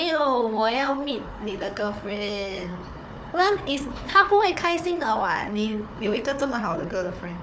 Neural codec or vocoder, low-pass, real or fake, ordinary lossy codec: codec, 16 kHz, 4 kbps, FunCodec, trained on Chinese and English, 50 frames a second; none; fake; none